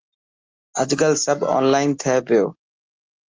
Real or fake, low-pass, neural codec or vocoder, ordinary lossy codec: real; 7.2 kHz; none; Opus, 24 kbps